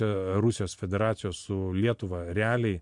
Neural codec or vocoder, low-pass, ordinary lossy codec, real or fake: none; 10.8 kHz; MP3, 64 kbps; real